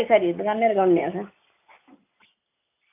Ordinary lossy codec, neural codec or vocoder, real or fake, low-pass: AAC, 24 kbps; none; real; 3.6 kHz